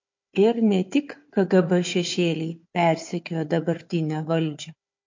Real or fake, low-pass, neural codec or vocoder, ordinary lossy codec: fake; 7.2 kHz; codec, 16 kHz, 4 kbps, FunCodec, trained on Chinese and English, 50 frames a second; MP3, 48 kbps